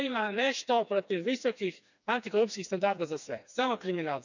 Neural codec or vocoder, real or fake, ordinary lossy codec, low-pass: codec, 16 kHz, 2 kbps, FreqCodec, smaller model; fake; none; 7.2 kHz